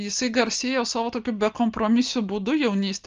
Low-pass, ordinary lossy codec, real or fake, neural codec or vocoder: 7.2 kHz; Opus, 24 kbps; real; none